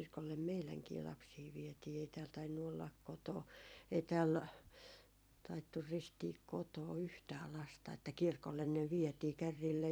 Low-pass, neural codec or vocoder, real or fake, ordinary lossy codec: none; vocoder, 44.1 kHz, 128 mel bands every 256 samples, BigVGAN v2; fake; none